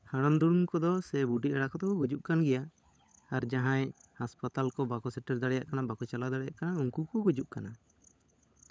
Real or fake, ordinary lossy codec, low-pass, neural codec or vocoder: fake; none; none; codec, 16 kHz, 16 kbps, FunCodec, trained on LibriTTS, 50 frames a second